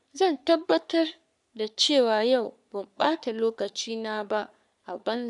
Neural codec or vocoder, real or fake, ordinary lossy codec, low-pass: codec, 44.1 kHz, 3.4 kbps, Pupu-Codec; fake; none; 10.8 kHz